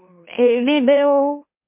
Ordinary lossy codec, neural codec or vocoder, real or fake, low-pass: MP3, 24 kbps; autoencoder, 44.1 kHz, a latent of 192 numbers a frame, MeloTTS; fake; 3.6 kHz